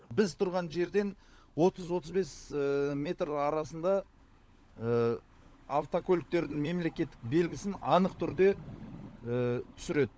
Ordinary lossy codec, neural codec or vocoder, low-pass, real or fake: none; codec, 16 kHz, 16 kbps, FunCodec, trained on LibriTTS, 50 frames a second; none; fake